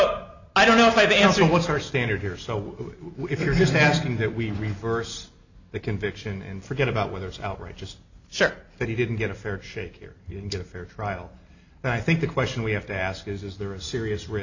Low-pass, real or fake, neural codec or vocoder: 7.2 kHz; real; none